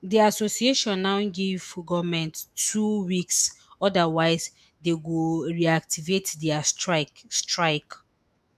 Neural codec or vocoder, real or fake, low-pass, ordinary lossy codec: autoencoder, 48 kHz, 128 numbers a frame, DAC-VAE, trained on Japanese speech; fake; 14.4 kHz; MP3, 96 kbps